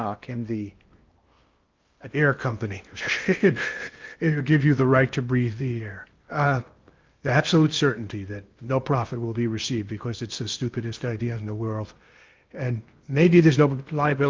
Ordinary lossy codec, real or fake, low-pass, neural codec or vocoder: Opus, 24 kbps; fake; 7.2 kHz; codec, 16 kHz in and 24 kHz out, 0.6 kbps, FocalCodec, streaming, 2048 codes